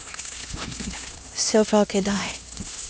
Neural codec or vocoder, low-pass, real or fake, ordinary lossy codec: codec, 16 kHz, 1 kbps, X-Codec, HuBERT features, trained on LibriSpeech; none; fake; none